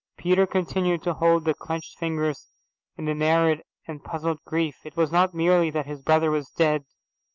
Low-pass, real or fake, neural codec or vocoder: 7.2 kHz; real; none